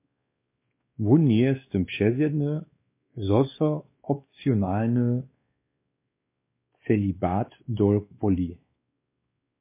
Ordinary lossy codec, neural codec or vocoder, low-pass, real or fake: MP3, 24 kbps; codec, 16 kHz, 2 kbps, X-Codec, WavLM features, trained on Multilingual LibriSpeech; 3.6 kHz; fake